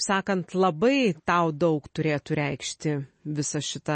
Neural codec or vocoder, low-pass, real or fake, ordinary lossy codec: none; 10.8 kHz; real; MP3, 32 kbps